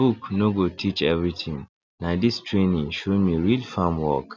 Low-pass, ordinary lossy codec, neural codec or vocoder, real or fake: 7.2 kHz; none; none; real